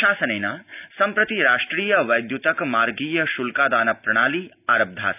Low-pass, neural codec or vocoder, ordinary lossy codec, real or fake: 3.6 kHz; none; none; real